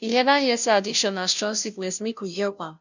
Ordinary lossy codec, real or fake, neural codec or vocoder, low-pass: none; fake; codec, 16 kHz, 0.5 kbps, FunCodec, trained on Chinese and English, 25 frames a second; 7.2 kHz